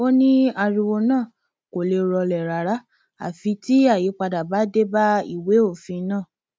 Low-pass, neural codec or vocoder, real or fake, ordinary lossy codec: none; none; real; none